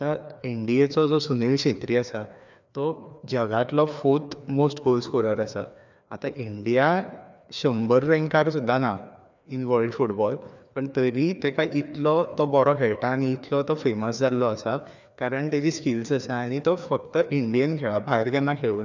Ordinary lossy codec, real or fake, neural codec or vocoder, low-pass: none; fake; codec, 16 kHz, 2 kbps, FreqCodec, larger model; 7.2 kHz